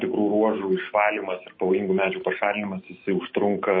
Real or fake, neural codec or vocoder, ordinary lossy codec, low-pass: fake; autoencoder, 48 kHz, 128 numbers a frame, DAC-VAE, trained on Japanese speech; MP3, 24 kbps; 7.2 kHz